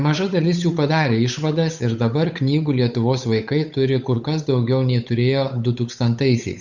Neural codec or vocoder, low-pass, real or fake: codec, 16 kHz, 8 kbps, FunCodec, trained on Chinese and English, 25 frames a second; 7.2 kHz; fake